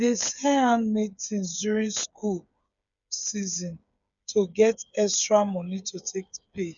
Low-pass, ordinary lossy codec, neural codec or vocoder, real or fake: 7.2 kHz; none; codec, 16 kHz, 8 kbps, FreqCodec, smaller model; fake